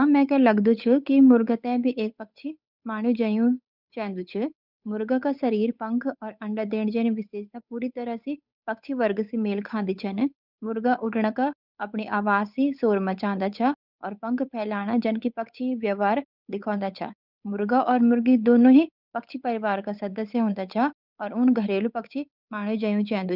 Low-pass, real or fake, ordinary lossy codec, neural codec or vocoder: 5.4 kHz; fake; none; codec, 16 kHz, 8 kbps, FunCodec, trained on Chinese and English, 25 frames a second